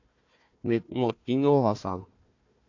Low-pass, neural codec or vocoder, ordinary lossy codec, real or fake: 7.2 kHz; codec, 16 kHz, 1 kbps, FunCodec, trained on Chinese and English, 50 frames a second; AAC, 48 kbps; fake